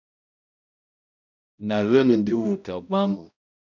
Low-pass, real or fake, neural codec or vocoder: 7.2 kHz; fake; codec, 16 kHz, 0.5 kbps, X-Codec, HuBERT features, trained on balanced general audio